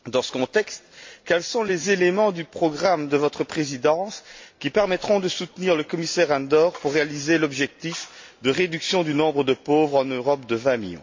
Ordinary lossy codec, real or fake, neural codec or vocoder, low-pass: MP3, 48 kbps; real; none; 7.2 kHz